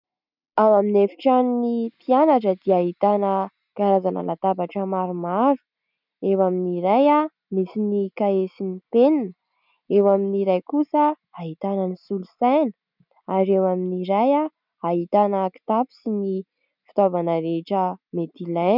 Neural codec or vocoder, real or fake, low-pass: none; real; 5.4 kHz